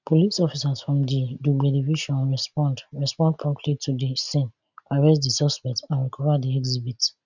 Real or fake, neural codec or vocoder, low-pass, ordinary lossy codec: real; none; 7.2 kHz; none